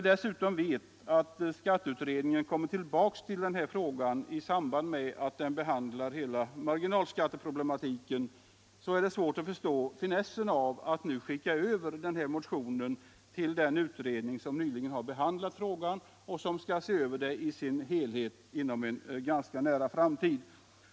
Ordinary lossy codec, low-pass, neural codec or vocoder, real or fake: none; none; none; real